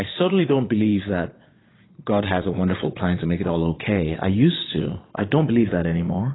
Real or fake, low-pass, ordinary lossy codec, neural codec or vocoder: fake; 7.2 kHz; AAC, 16 kbps; vocoder, 22.05 kHz, 80 mel bands, Vocos